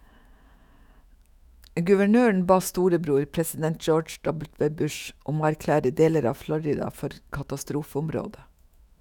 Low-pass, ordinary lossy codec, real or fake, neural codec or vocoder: 19.8 kHz; none; fake; autoencoder, 48 kHz, 128 numbers a frame, DAC-VAE, trained on Japanese speech